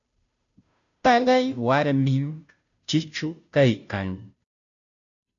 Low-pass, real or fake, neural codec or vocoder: 7.2 kHz; fake; codec, 16 kHz, 0.5 kbps, FunCodec, trained on Chinese and English, 25 frames a second